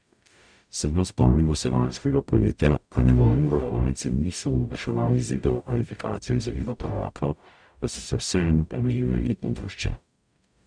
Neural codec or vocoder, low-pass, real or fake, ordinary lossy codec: codec, 44.1 kHz, 0.9 kbps, DAC; 9.9 kHz; fake; none